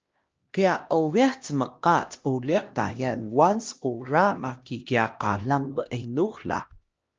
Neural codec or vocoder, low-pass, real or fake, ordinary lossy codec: codec, 16 kHz, 1 kbps, X-Codec, HuBERT features, trained on LibriSpeech; 7.2 kHz; fake; Opus, 24 kbps